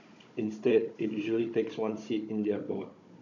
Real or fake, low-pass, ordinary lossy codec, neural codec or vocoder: fake; 7.2 kHz; none; codec, 16 kHz, 16 kbps, FunCodec, trained on Chinese and English, 50 frames a second